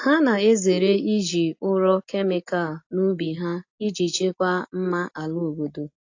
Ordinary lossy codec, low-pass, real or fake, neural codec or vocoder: AAC, 48 kbps; 7.2 kHz; real; none